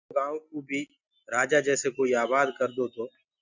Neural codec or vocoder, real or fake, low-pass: none; real; 7.2 kHz